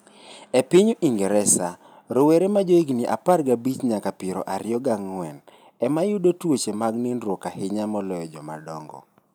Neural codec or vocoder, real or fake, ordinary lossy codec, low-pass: none; real; none; none